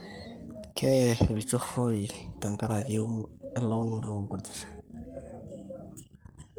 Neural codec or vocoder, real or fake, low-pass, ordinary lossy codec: codec, 44.1 kHz, 3.4 kbps, Pupu-Codec; fake; none; none